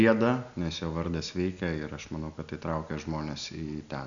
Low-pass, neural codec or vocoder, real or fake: 7.2 kHz; none; real